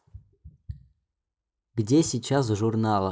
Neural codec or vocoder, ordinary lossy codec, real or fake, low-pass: none; none; real; none